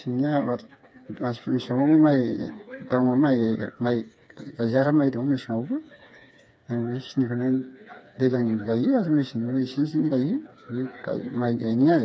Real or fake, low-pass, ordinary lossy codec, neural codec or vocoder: fake; none; none; codec, 16 kHz, 4 kbps, FreqCodec, smaller model